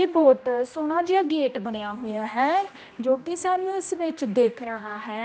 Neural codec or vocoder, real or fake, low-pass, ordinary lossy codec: codec, 16 kHz, 1 kbps, X-Codec, HuBERT features, trained on general audio; fake; none; none